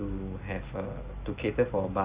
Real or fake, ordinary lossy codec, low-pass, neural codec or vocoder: real; Opus, 64 kbps; 3.6 kHz; none